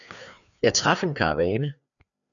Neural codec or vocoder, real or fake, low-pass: codec, 16 kHz, 2 kbps, FreqCodec, larger model; fake; 7.2 kHz